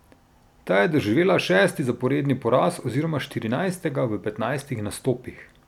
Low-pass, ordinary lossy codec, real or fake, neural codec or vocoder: 19.8 kHz; none; real; none